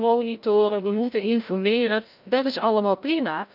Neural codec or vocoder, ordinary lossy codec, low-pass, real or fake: codec, 16 kHz, 0.5 kbps, FreqCodec, larger model; none; 5.4 kHz; fake